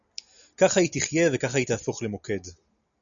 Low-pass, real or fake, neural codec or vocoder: 7.2 kHz; real; none